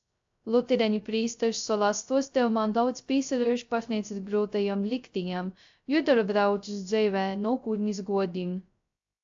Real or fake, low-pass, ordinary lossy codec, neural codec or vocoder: fake; 7.2 kHz; AAC, 64 kbps; codec, 16 kHz, 0.2 kbps, FocalCodec